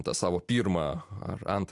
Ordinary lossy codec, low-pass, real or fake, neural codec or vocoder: AAC, 64 kbps; 10.8 kHz; real; none